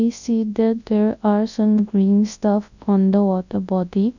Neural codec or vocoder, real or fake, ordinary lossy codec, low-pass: codec, 24 kHz, 0.9 kbps, WavTokenizer, large speech release; fake; none; 7.2 kHz